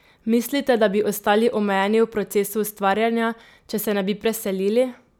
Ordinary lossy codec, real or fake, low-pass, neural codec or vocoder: none; real; none; none